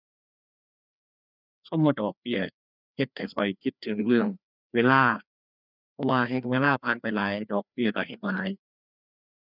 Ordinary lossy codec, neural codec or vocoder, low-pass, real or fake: none; codec, 16 kHz, 2 kbps, FreqCodec, larger model; 5.4 kHz; fake